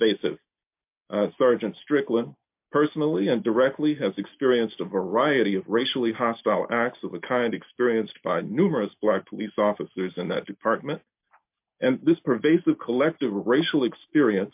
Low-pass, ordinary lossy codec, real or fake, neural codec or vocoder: 3.6 kHz; MP3, 32 kbps; real; none